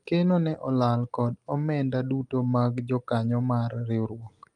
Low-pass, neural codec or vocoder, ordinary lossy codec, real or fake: 10.8 kHz; none; Opus, 32 kbps; real